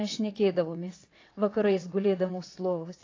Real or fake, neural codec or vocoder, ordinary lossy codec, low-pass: fake; vocoder, 22.05 kHz, 80 mel bands, WaveNeXt; AAC, 32 kbps; 7.2 kHz